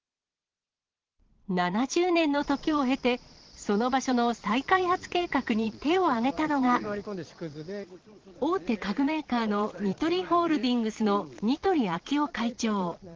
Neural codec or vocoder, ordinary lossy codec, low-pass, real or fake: vocoder, 22.05 kHz, 80 mel bands, Vocos; Opus, 16 kbps; 7.2 kHz; fake